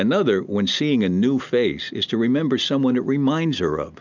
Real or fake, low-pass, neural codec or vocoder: real; 7.2 kHz; none